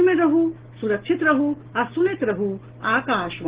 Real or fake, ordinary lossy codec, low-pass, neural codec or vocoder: real; Opus, 16 kbps; 3.6 kHz; none